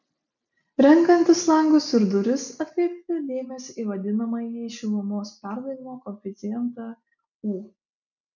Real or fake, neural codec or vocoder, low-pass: real; none; 7.2 kHz